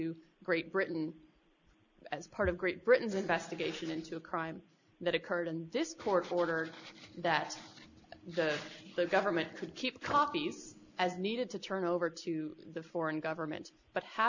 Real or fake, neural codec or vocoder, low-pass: real; none; 7.2 kHz